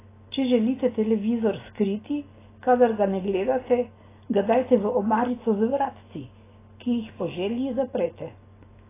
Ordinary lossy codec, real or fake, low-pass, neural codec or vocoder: AAC, 16 kbps; real; 3.6 kHz; none